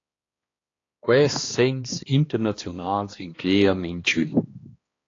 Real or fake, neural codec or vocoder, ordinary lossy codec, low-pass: fake; codec, 16 kHz, 2 kbps, X-Codec, HuBERT features, trained on balanced general audio; AAC, 32 kbps; 7.2 kHz